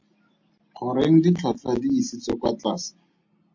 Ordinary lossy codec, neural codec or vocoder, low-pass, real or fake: MP3, 48 kbps; none; 7.2 kHz; real